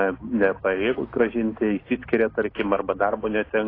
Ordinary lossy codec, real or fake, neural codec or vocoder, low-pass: AAC, 24 kbps; real; none; 5.4 kHz